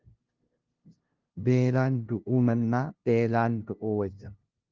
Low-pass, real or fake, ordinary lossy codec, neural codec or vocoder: 7.2 kHz; fake; Opus, 16 kbps; codec, 16 kHz, 0.5 kbps, FunCodec, trained on LibriTTS, 25 frames a second